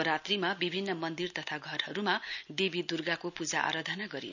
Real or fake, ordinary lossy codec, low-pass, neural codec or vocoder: real; none; 7.2 kHz; none